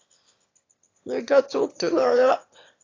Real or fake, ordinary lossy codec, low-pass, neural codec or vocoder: fake; AAC, 32 kbps; 7.2 kHz; autoencoder, 22.05 kHz, a latent of 192 numbers a frame, VITS, trained on one speaker